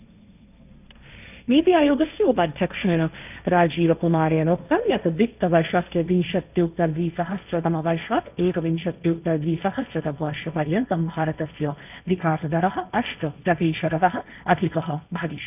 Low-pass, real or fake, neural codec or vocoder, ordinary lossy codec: 3.6 kHz; fake; codec, 16 kHz, 1.1 kbps, Voila-Tokenizer; none